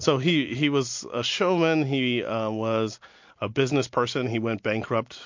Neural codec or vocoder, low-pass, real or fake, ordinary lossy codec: none; 7.2 kHz; real; MP3, 48 kbps